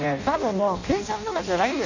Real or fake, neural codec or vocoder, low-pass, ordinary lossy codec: fake; codec, 16 kHz in and 24 kHz out, 0.6 kbps, FireRedTTS-2 codec; 7.2 kHz; none